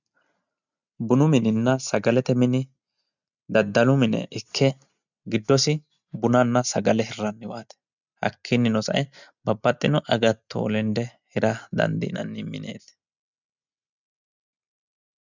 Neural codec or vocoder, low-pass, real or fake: none; 7.2 kHz; real